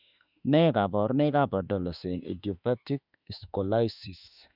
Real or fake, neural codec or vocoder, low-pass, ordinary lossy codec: fake; autoencoder, 48 kHz, 32 numbers a frame, DAC-VAE, trained on Japanese speech; 5.4 kHz; none